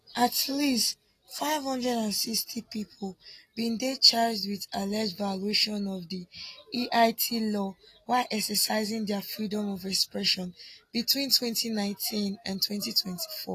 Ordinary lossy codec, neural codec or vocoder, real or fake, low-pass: AAC, 48 kbps; none; real; 14.4 kHz